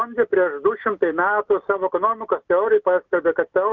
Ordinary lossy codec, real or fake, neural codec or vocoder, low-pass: Opus, 24 kbps; real; none; 7.2 kHz